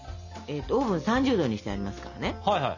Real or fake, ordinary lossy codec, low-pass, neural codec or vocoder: real; none; 7.2 kHz; none